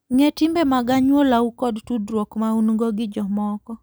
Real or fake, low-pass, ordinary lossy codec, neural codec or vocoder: real; none; none; none